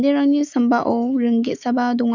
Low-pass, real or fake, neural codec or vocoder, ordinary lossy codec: 7.2 kHz; fake; autoencoder, 48 kHz, 128 numbers a frame, DAC-VAE, trained on Japanese speech; none